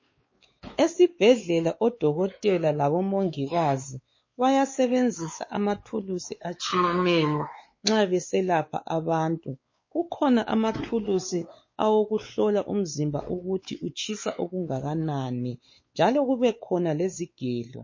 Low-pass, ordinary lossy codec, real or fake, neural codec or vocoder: 7.2 kHz; MP3, 32 kbps; fake; codec, 16 kHz, 4 kbps, X-Codec, WavLM features, trained on Multilingual LibriSpeech